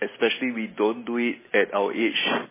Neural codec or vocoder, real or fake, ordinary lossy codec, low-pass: none; real; MP3, 16 kbps; 3.6 kHz